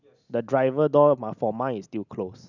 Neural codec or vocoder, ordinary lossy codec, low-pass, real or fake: none; none; 7.2 kHz; real